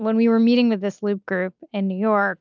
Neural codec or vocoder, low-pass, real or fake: none; 7.2 kHz; real